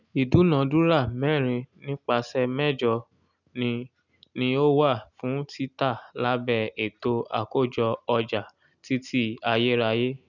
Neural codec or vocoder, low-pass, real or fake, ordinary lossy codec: none; 7.2 kHz; real; none